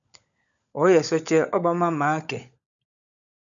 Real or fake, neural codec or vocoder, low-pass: fake; codec, 16 kHz, 16 kbps, FunCodec, trained on LibriTTS, 50 frames a second; 7.2 kHz